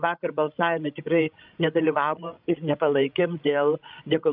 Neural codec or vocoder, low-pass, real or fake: codec, 16 kHz, 16 kbps, FreqCodec, larger model; 5.4 kHz; fake